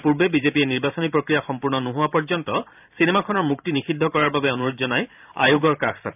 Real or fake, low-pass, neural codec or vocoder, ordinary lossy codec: fake; 3.6 kHz; vocoder, 44.1 kHz, 128 mel bands every 512 samples, BigVGAN v2; none